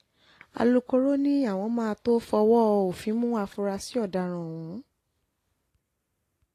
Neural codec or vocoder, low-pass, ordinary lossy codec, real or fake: none; 14.4 kHz; AAC, 48 kbps; real